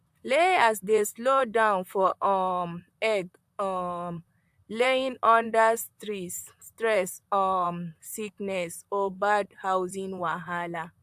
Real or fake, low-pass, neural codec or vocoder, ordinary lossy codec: fake; 14.4 kHz; vocoder, 44.1 kHz, 128 mel bands, Pupu-Vocoder; AAC, 96 kbps